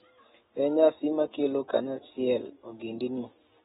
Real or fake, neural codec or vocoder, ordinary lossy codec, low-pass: real; none; AAC, 16 kbps; 19.8 kHz